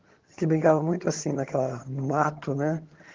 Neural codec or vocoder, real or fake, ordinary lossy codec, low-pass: vocoder, 22.05 kHz, 80 mel bands, HiFi-GAN; fake; Opus, 16 kbps; 7.2 kHz